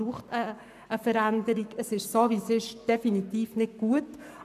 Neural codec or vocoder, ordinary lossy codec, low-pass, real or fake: codec, 44.1 kHz, 7.8 kbps, Pupu-Codec; none; 14.4 kHz; fake